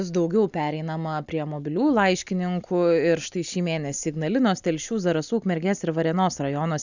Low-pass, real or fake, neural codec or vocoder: 7.2 kHz; real; none